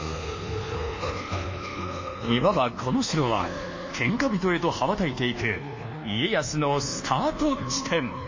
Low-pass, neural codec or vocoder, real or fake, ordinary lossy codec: 7.2 kHz; codec, 24 kHz, 1.2 kbps, DualCodec; fake; MP3, 32 kbps